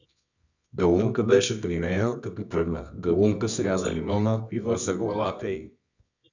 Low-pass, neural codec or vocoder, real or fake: 7.2 kHz; codec, 24 kHz, 0.9 kbps, WavTokenizer, medium music audio release; fake